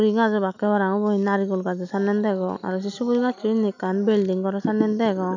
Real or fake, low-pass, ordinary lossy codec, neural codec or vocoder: real; 7.2 kHz; none; none